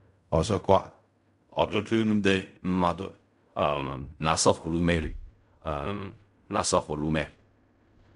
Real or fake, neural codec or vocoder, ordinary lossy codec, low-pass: fake; codec, 16 kHz in and 24 kHz out, 0.4 kbps, LongCat-Audio-Codec, fine tuned four codebook decoder; none; 10.8 kHz